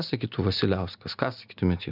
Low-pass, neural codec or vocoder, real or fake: 5.4 kHz; none; real